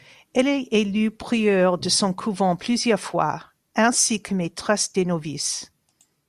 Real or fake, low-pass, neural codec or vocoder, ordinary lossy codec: real; 14.4 kHz; none; Opus, 64 kbps